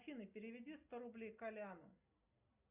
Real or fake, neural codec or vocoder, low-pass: real; none; 3.6 kHz